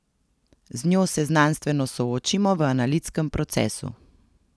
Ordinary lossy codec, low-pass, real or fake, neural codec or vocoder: none; none; real; none